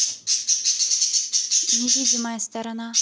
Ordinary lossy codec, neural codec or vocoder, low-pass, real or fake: none; none; none; real